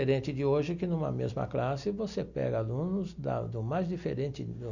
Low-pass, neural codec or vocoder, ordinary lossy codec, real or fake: 7.2 kHz; none; none; real